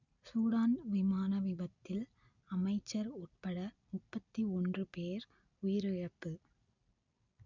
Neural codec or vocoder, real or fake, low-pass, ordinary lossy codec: none; real; 7.2 kHz; none